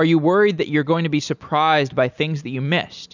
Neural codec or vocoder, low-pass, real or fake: none; 7.2 kHz; real